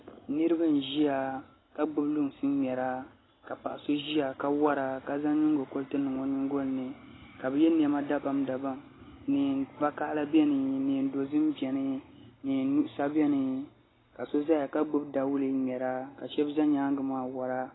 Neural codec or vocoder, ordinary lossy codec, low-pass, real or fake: none; AAC, 16 kbps; 7.2 kHz; real